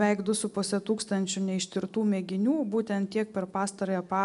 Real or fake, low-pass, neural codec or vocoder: real; 10.8 kHz; none